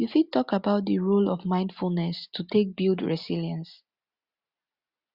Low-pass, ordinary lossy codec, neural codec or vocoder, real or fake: 5.4 kHz; Opus, 64 kbps; none; real